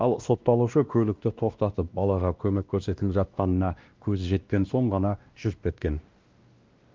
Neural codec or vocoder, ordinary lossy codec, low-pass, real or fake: codec, 16 kHz, 1 kbps, X-Codec, WavLM features, trained on Multilingual LibriSpeech; Opus, 32 kbps; 7.2 kHz; fake